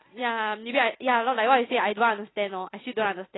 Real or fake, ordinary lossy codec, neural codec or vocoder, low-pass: real; AAC, 16 kbps; none; 7.2 kHz